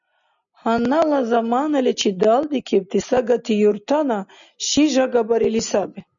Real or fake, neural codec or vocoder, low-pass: real; none; 7.2 kHz